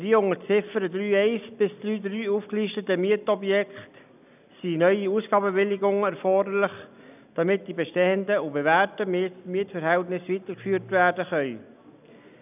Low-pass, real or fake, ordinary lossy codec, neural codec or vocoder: 3.6 kHz; real; none; none